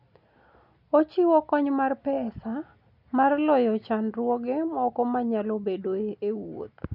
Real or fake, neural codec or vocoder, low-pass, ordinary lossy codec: real; none; 5.4 kHz; none